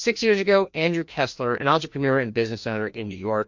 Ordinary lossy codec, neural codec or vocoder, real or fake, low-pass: MP3, 48 kbps; codec, 16 kHz, 1 kbps, FreqCodec, larger model; fake; 7.2 kHz